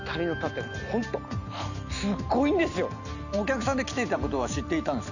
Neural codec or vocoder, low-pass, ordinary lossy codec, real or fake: none; 7.2 kHz; none; real